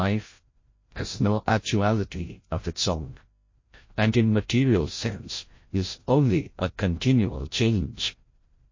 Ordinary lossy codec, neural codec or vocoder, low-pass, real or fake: MP3, 32 kbps; codec, 16 kHz, 0.5 kbps, FreqCodec, larger model; 7.2 kHz; fake